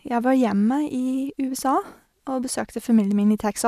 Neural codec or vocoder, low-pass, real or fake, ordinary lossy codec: none; 14.4 kHz; real; none